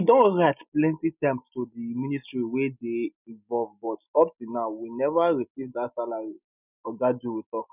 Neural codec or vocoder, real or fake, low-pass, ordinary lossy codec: none; real; 3.6 kHz; none